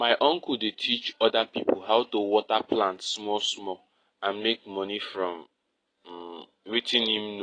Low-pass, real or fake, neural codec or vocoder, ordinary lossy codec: 9.9 kHz; real; none; AAC, 32 kbps